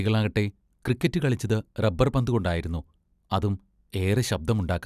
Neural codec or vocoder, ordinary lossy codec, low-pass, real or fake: none; none; 14.4 kHz; real